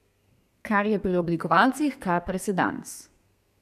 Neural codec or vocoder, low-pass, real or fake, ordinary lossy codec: codec, 32 kHz, 1.9 kbps, SNAC; 14.4 kHz; fake; none